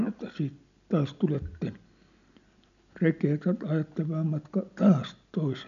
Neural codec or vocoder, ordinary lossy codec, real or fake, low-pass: none; none; real; 7.2 kHz